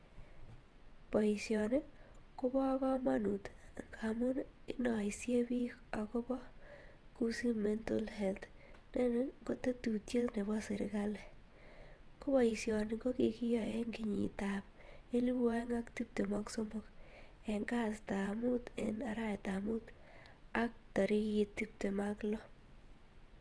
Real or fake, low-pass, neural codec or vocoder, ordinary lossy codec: fake; none; vocoder, 22.05 kHz, 80 mel bands, WaveNeXt; none